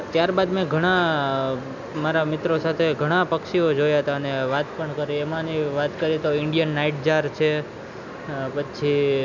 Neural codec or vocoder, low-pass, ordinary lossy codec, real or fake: none; 7.2 kHz; none; real